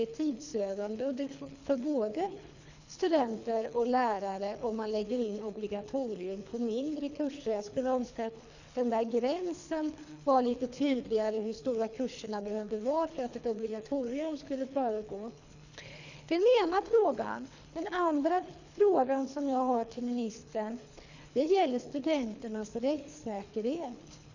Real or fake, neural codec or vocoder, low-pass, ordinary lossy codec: fake; codec, 24 kHz, 3 kbps, HILCodec; 7.2 kHz; none